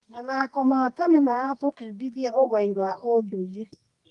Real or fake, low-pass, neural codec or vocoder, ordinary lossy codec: fake; 10.8 kHz; codec, 24 kHz, 0.9 kbps, WavTokenizer, medium music audio release; Opus, 32 kbps